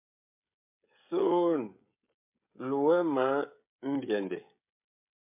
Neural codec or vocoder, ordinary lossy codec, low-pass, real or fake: codec, 16 kHz, 16 kbps, FreqCodec, smaller model; MP3, 32 kbps; 3.6 kHz; fake